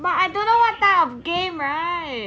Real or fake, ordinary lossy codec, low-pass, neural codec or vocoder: real; none; none; none